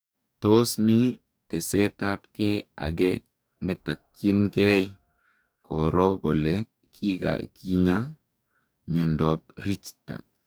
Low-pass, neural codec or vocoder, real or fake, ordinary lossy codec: none; codec, 44.1 kHz, 2.6 kbps, DAC; fake; none